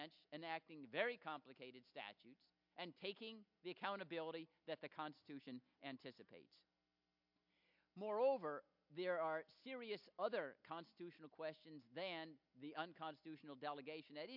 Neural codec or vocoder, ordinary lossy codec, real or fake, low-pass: none; MP3, 48 kbps; real; 5.4 kHz